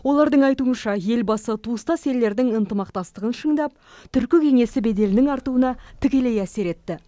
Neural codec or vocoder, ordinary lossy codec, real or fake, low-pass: none; none; real; none